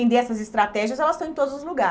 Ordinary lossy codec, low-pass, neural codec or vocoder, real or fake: none; none; none; real